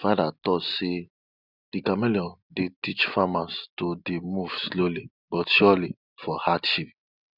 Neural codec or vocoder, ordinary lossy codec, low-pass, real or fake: none; none; 5.4 kHz; real